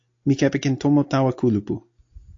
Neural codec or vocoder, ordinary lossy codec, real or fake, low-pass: none; AAC, 48 kbps; real; 7.2 kHz